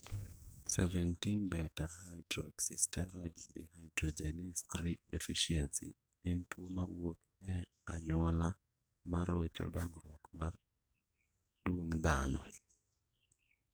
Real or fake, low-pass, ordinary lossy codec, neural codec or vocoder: fake; none; none; codec, 44.1 kHz, 2.6 kbps, SNAC